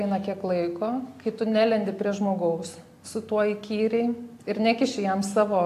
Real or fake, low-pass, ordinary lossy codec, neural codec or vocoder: real; 14.4 kHz; AAC, 64 kbps; none